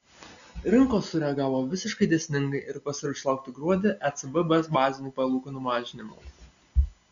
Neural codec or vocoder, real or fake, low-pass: none; real; 7.2 kHz